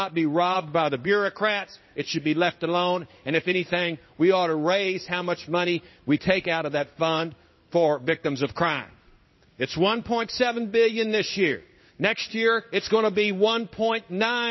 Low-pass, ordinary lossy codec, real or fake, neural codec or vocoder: 7.2 kHz; MP3, 24 kbps; fake; codec, 16 kHz in and 24 kHz out, 1 kbps, XY-Tokenizer